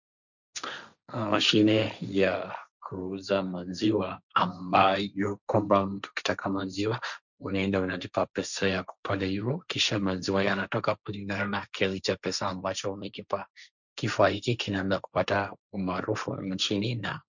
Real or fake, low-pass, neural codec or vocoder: fake; 7.2 kHz; codec, 16 kHz, 1.1 kbps, Voila-Tokenizer